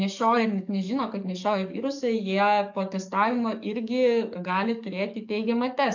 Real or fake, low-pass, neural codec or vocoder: fake; 7.2 kHz; codec, 44.1 kHz, 7.8 kbps, DAC